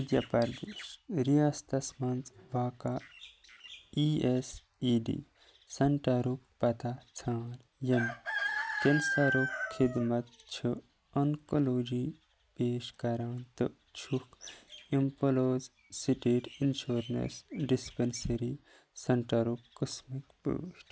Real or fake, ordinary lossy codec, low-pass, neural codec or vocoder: real; none; none; none